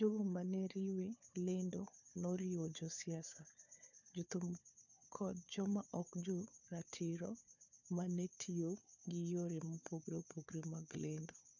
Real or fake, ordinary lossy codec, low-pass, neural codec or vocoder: fake; none; 7.2 kHz; codec, 16 kHz, 4 kbps, FunCodec, trained on Chinese and English, 50 frames a second